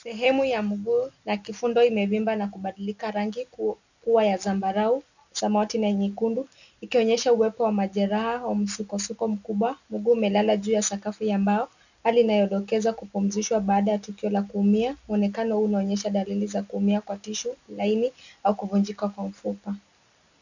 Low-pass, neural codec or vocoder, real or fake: 7.2 kHz; none; real